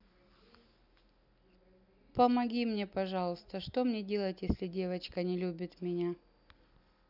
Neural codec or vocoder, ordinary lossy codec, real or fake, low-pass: none; none; real; 5.4 kHz